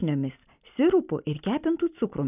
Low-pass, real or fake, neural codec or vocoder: 3.6 kHz; real; none